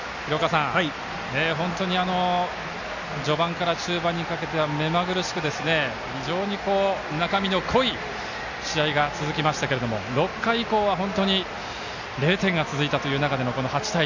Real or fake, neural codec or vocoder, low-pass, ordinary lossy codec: real; none; 7.2 kHz; none